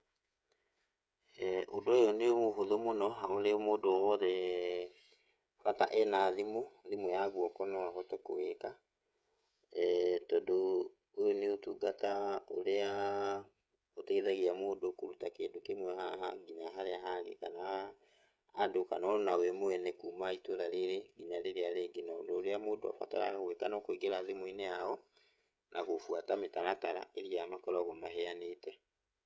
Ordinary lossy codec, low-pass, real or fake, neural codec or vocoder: none; none; fake; codec, 16 kHz, 16 kbps, FreqCodec, smaller model